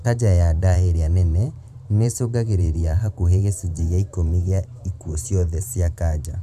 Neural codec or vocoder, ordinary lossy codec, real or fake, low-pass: vocoder, 44.1 kHz, 128 mel bands every 512 samples, BigVGAN v2; none; fake; 14.4 kHz